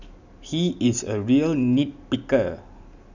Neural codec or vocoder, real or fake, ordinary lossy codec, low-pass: none; real; none; 7.2 kHz